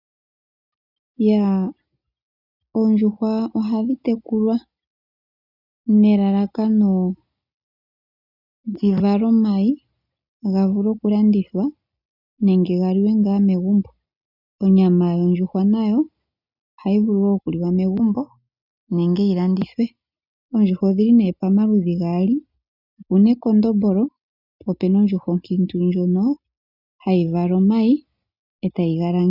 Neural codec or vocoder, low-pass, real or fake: none; 5.4 kHz; real